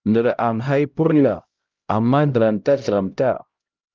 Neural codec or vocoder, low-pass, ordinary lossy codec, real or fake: codec, 16 kHz, 0.5 kbps, X-Codec, HuBERT features, trained on LibriSpeech; 7.2 kHz; Opus, 32 kbps; fake